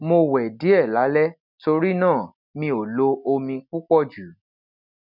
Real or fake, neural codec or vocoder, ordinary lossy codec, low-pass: real; none; none; 5.4 kHz